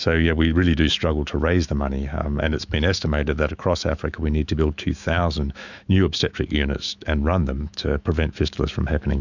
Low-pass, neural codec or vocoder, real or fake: 7.2 kHz; autoencoder, 48 kHz, 128 numbers a frame, DAC-VAE, trained on Japanese speech; fake